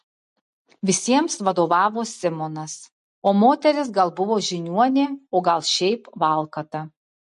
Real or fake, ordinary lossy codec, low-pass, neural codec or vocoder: real; MP3, 48 kbps; 14.4 kHz; none